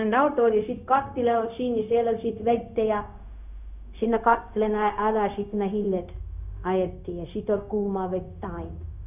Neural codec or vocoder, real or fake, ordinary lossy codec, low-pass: codec, 16 kHz, 0.4 kbps, LongCat-Audio-Codec; fake; none; 3.6 kHz